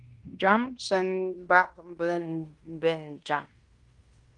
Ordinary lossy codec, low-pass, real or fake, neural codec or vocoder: Opus, 32 kbps; 10.8 kHz; fake; codec, 16 kHz in and 24 kHz out, 0.9 kbps, LongCat-Audio-Codec, fine tuned four codebook decoder